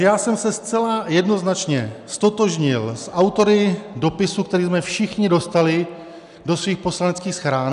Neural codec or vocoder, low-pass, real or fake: none; 10.8 kHz; real